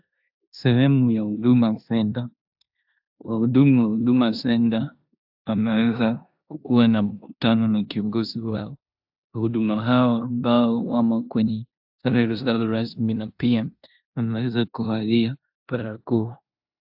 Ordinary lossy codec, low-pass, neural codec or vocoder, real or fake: AAC, 48 kbps; 5.4 kHz; codec, 16 kHz in and 24 kHz out, 0.9 kbps, LongCat-Audio-Codec, four codebook decoder; fake